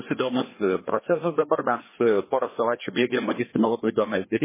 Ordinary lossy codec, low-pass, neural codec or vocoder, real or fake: MP3, 16 kbps; 3.6 kHz; codec, 16 kHz, 2 kbps, FreqCodec, larger model; fake